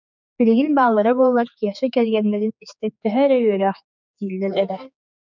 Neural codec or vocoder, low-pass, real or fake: codec, 16 kHz, 4 kbps, X-Codec, HuBERT features, trained on general audio; 7.2 kHz; fake